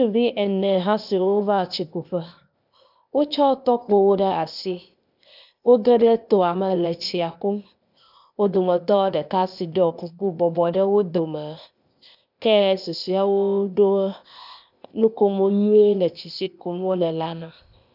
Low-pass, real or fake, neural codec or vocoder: 5.4 kHz; fake; codec, 16 kHz, 0.8 kbps, ZipCodec